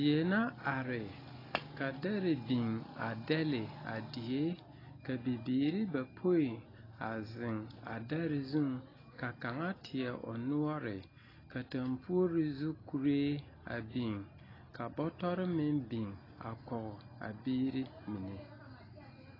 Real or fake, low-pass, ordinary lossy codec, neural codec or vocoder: real; 5.4 kHz; AAC, 24 kbps; none